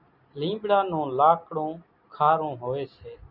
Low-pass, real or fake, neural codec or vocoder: 5.4 kHz; real; none